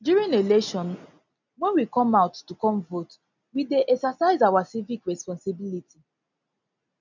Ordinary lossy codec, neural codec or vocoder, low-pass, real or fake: none; none; 7.2 kHz; real